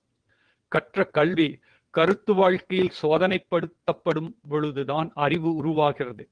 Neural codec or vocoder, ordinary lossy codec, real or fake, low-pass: vocoder, 22.05 kHz, 80 mel bands, WaveNeXt; Opus, 24 kbps; fake; 9.9 kHz